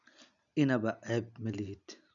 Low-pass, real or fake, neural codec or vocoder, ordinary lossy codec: 7.2 kHz; real; none; none